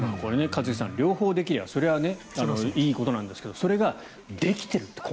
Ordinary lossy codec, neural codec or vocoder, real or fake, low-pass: none; none; real; none